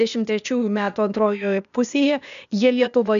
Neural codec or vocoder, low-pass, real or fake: codec, 16 kHz, 0.8 kbps, ZipCodec; 7.2 kHz; fake